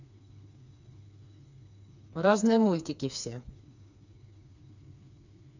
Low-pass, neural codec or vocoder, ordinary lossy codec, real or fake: 7.2 kHz; codec, 16 kHz, 4 kbps, FreqCodec, smaller model; none; fake